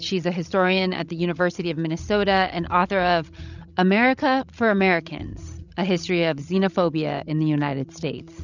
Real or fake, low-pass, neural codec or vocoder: fake; 7.2 kHz; codec, 16 kHz, 16 kbps, FreqCodec, larger model